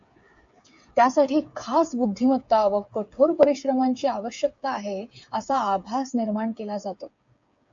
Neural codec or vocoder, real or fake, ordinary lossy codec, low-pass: codec, 16 kHz, 8 kbps, FreqCodec, smaller model; fake; AAC, 64 kbps; 7.2 kHz